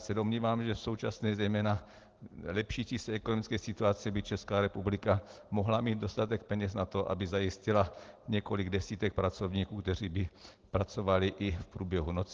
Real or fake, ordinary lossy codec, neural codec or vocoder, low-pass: real; Opus, 16 kbps; none; 7.2 kHz